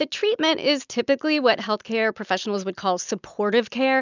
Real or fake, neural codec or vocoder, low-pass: real; none; 7.2 kHz